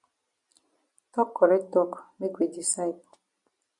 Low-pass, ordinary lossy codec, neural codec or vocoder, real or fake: 10.8 kHz; MP3, 64 kbps; none; real